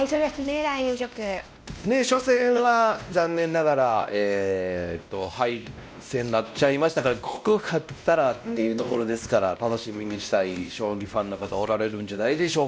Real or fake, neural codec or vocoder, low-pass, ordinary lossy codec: fake; codec, 16 kHz, 1 kbps, X-Codec, WavLM features, trained on Multilingual LibriSpeech; none; none